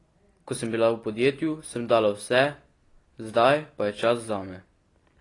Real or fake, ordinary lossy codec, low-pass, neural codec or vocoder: real; AAC, 32 kbps; 10.8 kHz; none